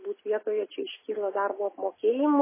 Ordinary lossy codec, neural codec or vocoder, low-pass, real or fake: MP3, 16 kbps; none; 3.6 kHz; real